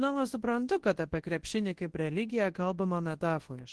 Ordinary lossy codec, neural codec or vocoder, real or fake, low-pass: Opus, 16 kbps; codec, 24 kHz, 0.9 kbps, WavTokenizer, large speech release; fake; 10.8 kHz